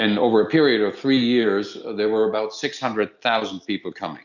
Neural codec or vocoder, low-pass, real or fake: none; 7.2 kHz; real